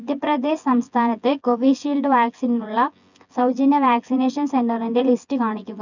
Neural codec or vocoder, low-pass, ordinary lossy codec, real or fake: vocoder, 24 kHz, 100 mel bands, Vocos; 7.2 kHz; none; fake